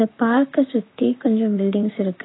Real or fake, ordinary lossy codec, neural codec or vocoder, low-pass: fake; AAC, 16 kbps; vocoder, 44.1 kHz, 128 mel bands, Pupu-Vocoder; 7.2 kHz